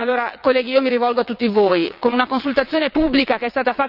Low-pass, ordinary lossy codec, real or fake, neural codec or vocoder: 5.4 kHz; none; fake; vocoder, 22.05 kHz, 80 mel bands, WaveNeXt